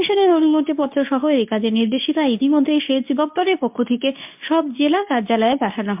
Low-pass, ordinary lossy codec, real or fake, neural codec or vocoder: 3.6 kHz; MP3, 32 kbps; fake; codec, 24 kHz, 0.9 kbps, WavTokenizer, medium speech release version 2